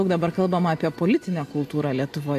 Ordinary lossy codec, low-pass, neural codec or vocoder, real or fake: AAC, 64 kbps; 14.4 kHz; none; real